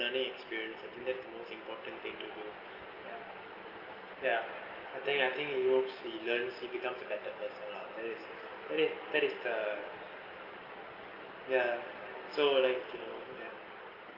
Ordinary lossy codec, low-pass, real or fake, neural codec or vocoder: Opus, 32 kbps; 5.4 kHz; real; none